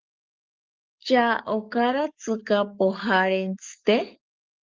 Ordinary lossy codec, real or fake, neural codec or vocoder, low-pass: Opus, 24 kbps; fake; codec, 44.1 kHz, 7.8 kbps, DAC; 7.2 kHz